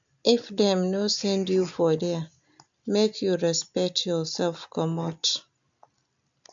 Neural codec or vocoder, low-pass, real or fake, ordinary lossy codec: none; 7.2 kHz; real; AAC, 64 kbps